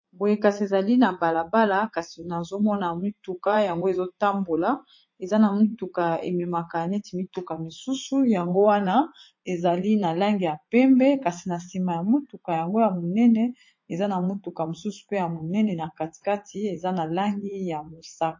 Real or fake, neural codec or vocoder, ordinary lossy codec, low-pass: fake; vocoder, 44.1 kHz, 80 mel bands, Vocos; MP3, 32 kbps; 7.2 kHz